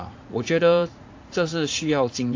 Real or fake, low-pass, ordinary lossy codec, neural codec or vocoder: fake; 7.2 kHz; AAC, 48 kbps; vocoder, 44.1 kHz, 80 mel bands, Vocos